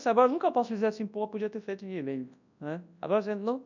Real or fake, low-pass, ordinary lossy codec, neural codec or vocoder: fake; 7.2 kHz; none; codec, 24 kHz, 0.9 kbps, WavTokenizer, large speech release